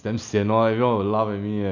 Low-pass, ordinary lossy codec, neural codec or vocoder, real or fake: 7.2 kHz; none; none; real